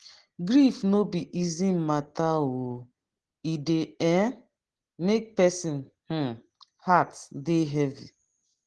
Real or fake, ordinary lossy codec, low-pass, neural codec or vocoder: real; Opus, 16 kbps; 10.8 kHz; none